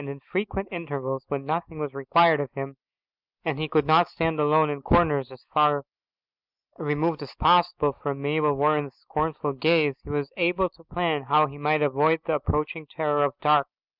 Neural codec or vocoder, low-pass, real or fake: none; 5.4 kHz; real